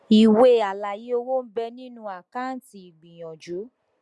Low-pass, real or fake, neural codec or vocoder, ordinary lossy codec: none; real; none; none